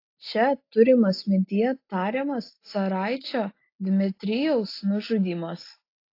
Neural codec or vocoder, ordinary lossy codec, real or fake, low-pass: none; AAC, 32 kbps; real; 5.4 kHz